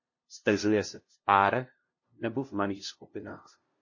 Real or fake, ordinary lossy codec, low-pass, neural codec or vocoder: fake; MP3, 32 kbps; 7.2 kHz; codec, 16 kHz, 0.5 kbps, FunCodec, trained on LibriTTS, 25 frames a second